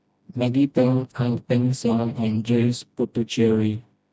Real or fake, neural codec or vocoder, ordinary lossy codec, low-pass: fake; codec, 16 kHz, 1 kbps, FreqCodec, smaller model; none; none